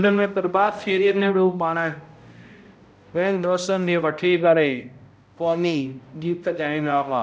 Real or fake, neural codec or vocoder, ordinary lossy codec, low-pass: fake; codec, 16 kHz, 0.5 kbps, X-Codec, HuBERT features, trained on balanced general audio; none; none